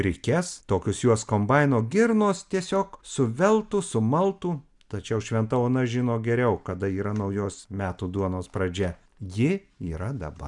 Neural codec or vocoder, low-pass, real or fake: none; 10.8 kHz; real